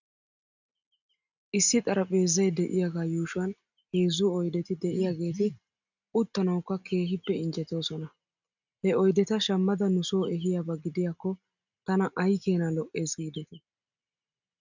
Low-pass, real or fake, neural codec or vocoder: 7.2 kHz; real; none